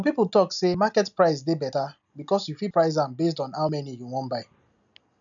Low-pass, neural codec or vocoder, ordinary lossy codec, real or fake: 7.2 kHz; none; none; real